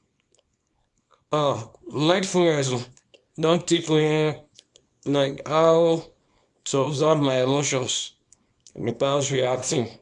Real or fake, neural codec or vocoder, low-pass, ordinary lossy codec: fake; codec, 24 kHz, 0.9 kbps, WavTokenizer, small release; 10.8 kHz; MP3, 96 kbps